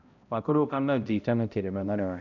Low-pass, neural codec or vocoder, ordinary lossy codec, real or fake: 7.2 kHz; codec, 16 kHz, 0.5 kbps, X-Codec, HuBERT features, trained on balanced general audio; none; fake